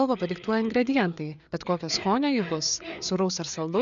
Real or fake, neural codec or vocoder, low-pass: fake; codec, 16 kHz, 4 kbps, FreqCodec, larger model; 7.2 kHz